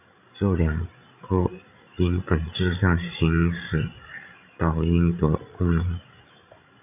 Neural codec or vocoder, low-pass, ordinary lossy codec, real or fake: codec, 16 kHz, 16 kbps, FreqCodec, larger model; 3.6 kHz; AAC, 32 kbps; fake